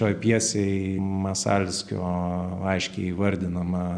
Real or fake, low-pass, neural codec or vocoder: real; 9.9 kHz; none